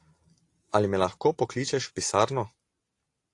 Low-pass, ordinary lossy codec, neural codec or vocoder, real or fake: 10.8 kHz; AAC, 48 kbps; none; real